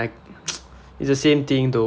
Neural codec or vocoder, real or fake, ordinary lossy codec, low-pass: none; real; none; none